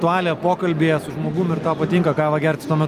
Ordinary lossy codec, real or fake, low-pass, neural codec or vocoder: Opus, 32 kbps; real; 14.4 kHz; none